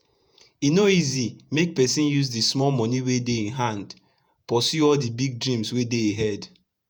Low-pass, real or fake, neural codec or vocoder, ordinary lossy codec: none; fake; vocoder, 48 kHz, 128 mel bands, Vocos; none